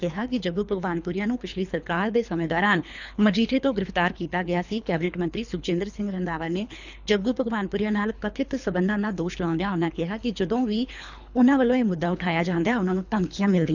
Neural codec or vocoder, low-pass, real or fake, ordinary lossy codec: codec, 24 kHz, 3 kbps, HILCodec; 7.2 kHz; fake; none